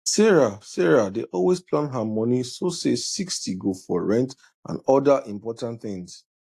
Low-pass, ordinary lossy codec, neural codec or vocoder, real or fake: 14.4 kHz; AAC, 64 kbps; none; real